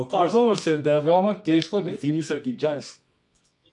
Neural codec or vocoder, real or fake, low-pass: codec, 24 kHz, 0.9 kbps, WavTokenizer, medium music audio release; fake; 10.8 kHz